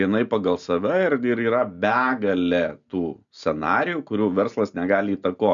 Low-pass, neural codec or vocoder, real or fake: 7.2 kHz; none; real